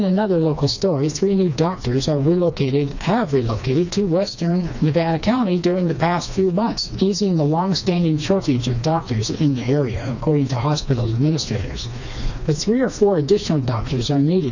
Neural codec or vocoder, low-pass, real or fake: codec, 16 kHz, 2 kbps, FreqCodec, smaller model; 7.2 kHz; fake